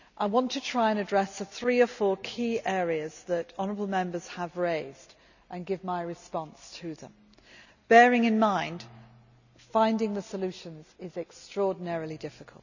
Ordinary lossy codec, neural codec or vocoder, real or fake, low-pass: none; none; real; 7.2 kHz